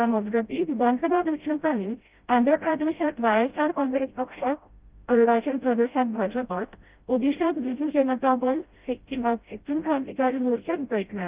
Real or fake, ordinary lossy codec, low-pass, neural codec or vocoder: fake; Opus, 32 kbps; 3.6 kHz; codec, 16 kHz, 0.5 kbps, FreqCodec, smaller model